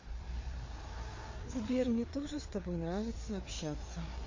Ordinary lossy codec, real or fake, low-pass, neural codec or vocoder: MP3, 32 kbps; fake; 7.2 kHz; codec, 16 kHz, 4 kbps, FreqCodec, larger model